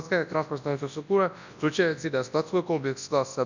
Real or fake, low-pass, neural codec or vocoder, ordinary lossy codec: fake; 7.2 kHz; codec, 24 kHz, 0.9 kbps, WavTokenizer, large speech release; none